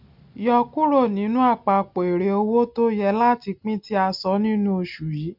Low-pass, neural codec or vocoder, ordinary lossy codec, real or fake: 5.4 kHz; none; none; real